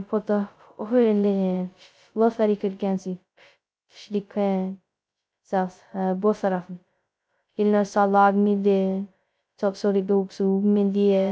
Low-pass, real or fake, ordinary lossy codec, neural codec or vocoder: none; fake; none; codec, 16 kHz, 0.2 kbps, FocalCodec